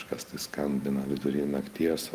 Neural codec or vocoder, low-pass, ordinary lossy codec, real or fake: none; 14.4 kHz; Opus, 32 kbps; real